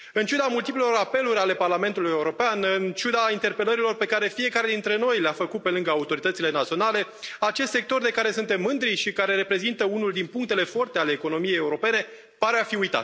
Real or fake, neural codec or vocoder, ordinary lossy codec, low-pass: real; none; none; none